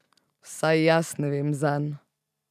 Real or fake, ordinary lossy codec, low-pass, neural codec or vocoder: fake; none; 14.4 kHz; vocoder, 44.1 kHz, 128 mel bands every 512 samples, BigVGAN v2